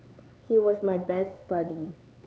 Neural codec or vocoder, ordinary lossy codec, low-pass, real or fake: codec, 16 kHz, 4 kbps, X-Codec, HuBERT features, trained on LibriSpeech; none; none; fake